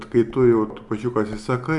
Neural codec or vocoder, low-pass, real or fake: none; 10.8 kHz; real